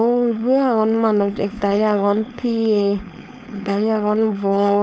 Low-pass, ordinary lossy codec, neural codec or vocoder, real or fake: none; none; codec, 16 kHz, 4.8 kbps, FACodec; fake